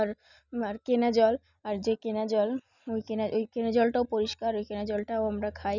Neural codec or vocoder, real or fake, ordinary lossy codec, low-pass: none; real; none; 7.2 kHz